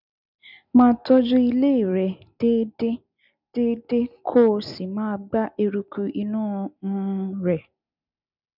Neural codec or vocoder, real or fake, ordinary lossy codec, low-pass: none; real; none; 5.4 kHz